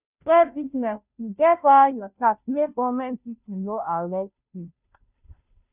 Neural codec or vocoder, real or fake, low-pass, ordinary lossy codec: codec, 16 kHz, 0.5 kbps, FunCodec, trained on Chinese and English, 25 frames a second; fake; 3.6 kHz; none